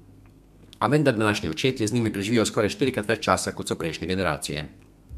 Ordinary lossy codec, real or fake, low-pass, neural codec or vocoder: MP3, 96 kbps; fake; 14.4 kHz; codec, 32 kHz, 1.9 kbps, SNAC